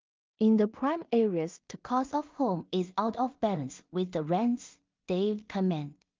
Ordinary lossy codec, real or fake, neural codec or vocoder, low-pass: Opus, 32 kbps; fake; codec, 16 kHz in and 24 kHz out, 0.4 kbps, LongCat-Audio-Codec, two codebook decoder; 7.2 kHz